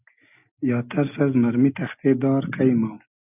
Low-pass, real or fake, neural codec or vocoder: 3.6 kHz; real; none